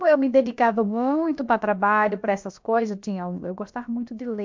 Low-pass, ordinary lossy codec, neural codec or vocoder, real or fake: 7.2 kHz; MP3, 64 kbps; codec, 16 kHz, about 1 kbps, DyCAST, with the encoder's durations; fake